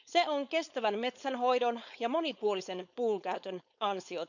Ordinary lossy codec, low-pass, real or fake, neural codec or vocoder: none; 7.2 kHz; fake; codec, 16 kHz, 4.8 kbps, FACodec